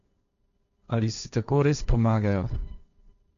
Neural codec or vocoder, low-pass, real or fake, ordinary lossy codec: codec, 16 kHz, 1.1 kbps, Voila-Tokenizer; 7.2 kHz; fake; none